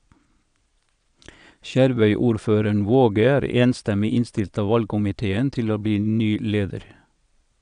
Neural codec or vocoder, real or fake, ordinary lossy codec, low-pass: vocoder, 22.05 kHz, 80 mel bands, Vocos; fake; none; 9.9 kHz